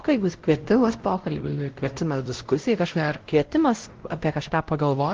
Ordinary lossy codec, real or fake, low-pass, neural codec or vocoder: Opus, 16 kbps; fake; 7.2 kHz; codec, 16 kHz, 0.5 kbps, X-Codec, WavLM features, trained on Multilingual LibriSpeech